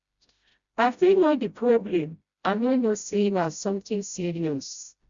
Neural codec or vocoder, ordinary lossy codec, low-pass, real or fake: codec, 16 kHz, 0.5 kbps, FreqCodec, smaller model; Opus, 64 kbps; 7.2 kHz; fake